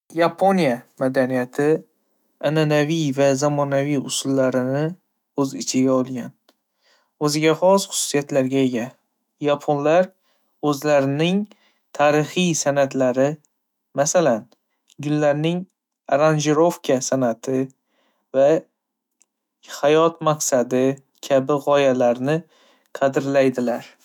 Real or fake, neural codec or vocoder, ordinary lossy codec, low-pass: fake; autoencoder, 48 kHz, 128 numbers a frame, DAC-VAE, trained on Japanese speech; none; 19.8 kHz